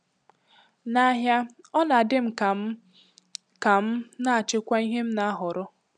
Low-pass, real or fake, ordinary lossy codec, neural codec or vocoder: 9.9 kHz; real; none; none